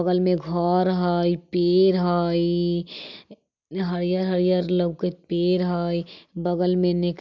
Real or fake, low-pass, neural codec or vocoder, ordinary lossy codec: real; 7.2 kHz; none; none